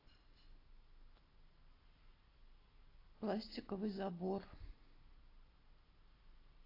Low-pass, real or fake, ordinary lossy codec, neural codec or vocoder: 5.4 kHz; real; AAC, 24 kbps; none